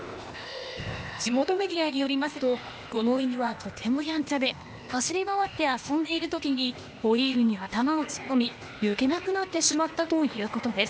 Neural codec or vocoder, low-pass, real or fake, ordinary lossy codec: codec, 16 kHz, 0.8 kbps, ZipCodec; none; fake; none